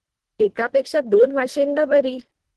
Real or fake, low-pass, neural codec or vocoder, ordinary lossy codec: fake; 10.8 kHz; codec, 24 kHz, 1.5 kbps, HILCodec; Opus, 16 kbps